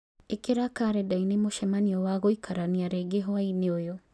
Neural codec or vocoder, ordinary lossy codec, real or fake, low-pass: none; none; real; none